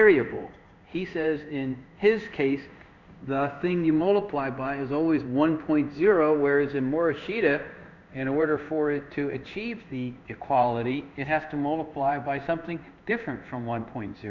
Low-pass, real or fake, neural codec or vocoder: 7.2 kHz; fake; codec, 16 kHz in and 24 kHz out, 1 kbps, XY-Tokenizer